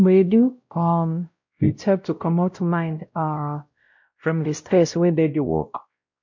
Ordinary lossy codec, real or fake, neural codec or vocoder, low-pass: MP3, 48 kbps; fake; codec, 16 kHz, 0.5 kbps, X-Codec, WavLM features, trained on Multilingual LibriSpeech; 7.2 kHz